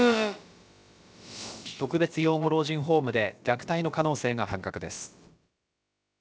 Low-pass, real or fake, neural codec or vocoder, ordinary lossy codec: none; fake; codec, 16 kHz, about 1 kbps, DyCAST, with the encoder's durations; none